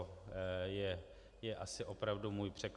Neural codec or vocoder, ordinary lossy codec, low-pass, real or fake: none; Opus, 64 kbps; 10.8 kHz; real